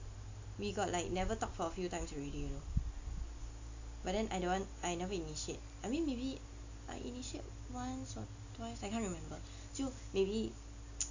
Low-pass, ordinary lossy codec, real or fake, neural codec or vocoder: 7.2 kHz; AAC, 48 kbps; real; none